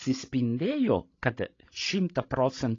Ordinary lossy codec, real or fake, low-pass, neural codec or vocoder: AAC, 32 kbps; fake; 7.2 kHz; codec, 16 kHz, 16 kbps, FreqCodec, larger model